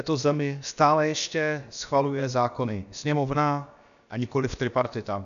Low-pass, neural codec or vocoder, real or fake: 7.2 kHz; codec, 16 kHz, about 1 kbps, DyCAST, with the encoder's durations; fake